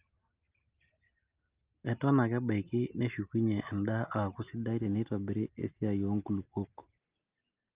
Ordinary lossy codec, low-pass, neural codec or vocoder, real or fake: Opus, 24 kbps; 3.6 kHz; none; real